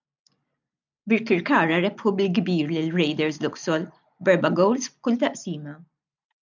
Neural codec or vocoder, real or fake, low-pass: none; real; 7.2 kHz